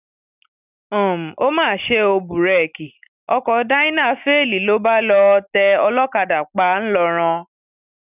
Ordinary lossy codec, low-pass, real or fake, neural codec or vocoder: none; 3.6 kHz; real; none